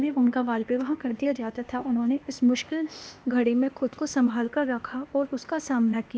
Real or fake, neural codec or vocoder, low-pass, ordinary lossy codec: fake; codec, 16 kHz, 0.8 kbps, ZipCodec; none; none